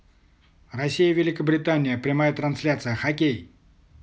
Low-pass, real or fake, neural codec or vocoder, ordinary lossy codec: none; real; none; none